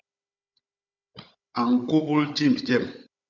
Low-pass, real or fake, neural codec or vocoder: 7.2 kHz; fake; codec, 16 kHz, 16 kbps, FunCodec, trained on Chinese and English, 50 frames a second